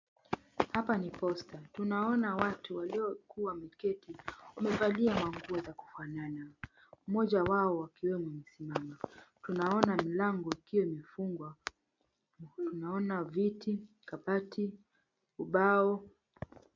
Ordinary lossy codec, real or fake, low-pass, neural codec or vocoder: MP3, 64 kbps; real; 7.2 kHz; none